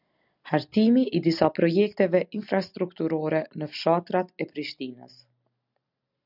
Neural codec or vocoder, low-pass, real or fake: none; 5.4 kHz; real